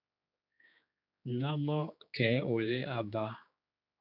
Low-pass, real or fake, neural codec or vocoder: 5.4 kHz; fake; codec, 16 kHz, 2 kbps, X-Codec, HuBERT features, trained on general audio